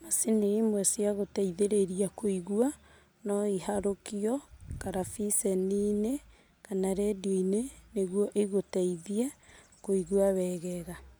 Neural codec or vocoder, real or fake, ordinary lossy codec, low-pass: none; real; none; none